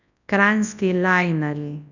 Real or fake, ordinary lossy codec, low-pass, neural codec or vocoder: fake; none; 7.2 kHz; codec, 24 kHz, 0.9 kbps, WavTokenizer, large speech release